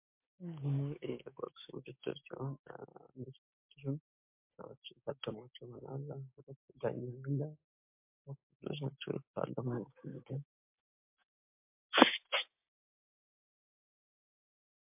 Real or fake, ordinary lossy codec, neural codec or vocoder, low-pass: fake; MP3, 32 kbps; codec, 16 kHz, 6 kbps, DAC; 3.6 kHz